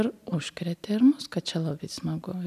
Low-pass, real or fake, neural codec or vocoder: 14.4 kHz; real; none